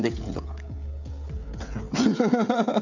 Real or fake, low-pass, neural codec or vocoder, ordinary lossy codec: fake; 7.2 kHz; codec, 16 kHz, 16 kbps, FreqCodec, smaller model; none